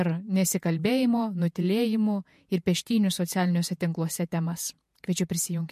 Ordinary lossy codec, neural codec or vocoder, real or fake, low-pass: MP3, 64 kbps; vocoder, 48 kHz, 128 mel bands, Vocos; fake; 14.4 kHz